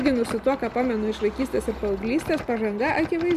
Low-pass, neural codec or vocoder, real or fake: 14.4 kHz; none; real